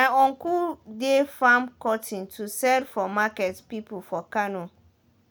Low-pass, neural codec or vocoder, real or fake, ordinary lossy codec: none; none; real; none